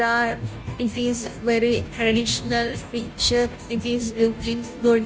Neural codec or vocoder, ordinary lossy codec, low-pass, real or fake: codec, 16 kHz, 0.5 kbps, FunCodec, trained on Chinese and English, 25 frames a second; none; none; fake